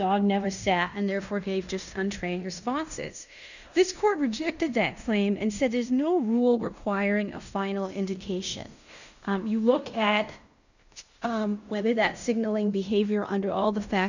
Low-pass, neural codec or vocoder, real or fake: 7.2 kHz; codec, 16 kHz in and 24 kHz out, 0.9 kbps, LongCat-Audio-Codec, fine tuned four codebook decoder; fake